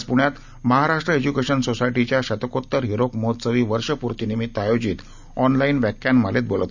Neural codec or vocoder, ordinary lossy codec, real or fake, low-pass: none; none; real; 7.2 kHz